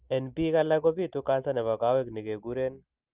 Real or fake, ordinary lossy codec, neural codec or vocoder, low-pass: real; Opus, 32 kbps; none; 3.6 kHz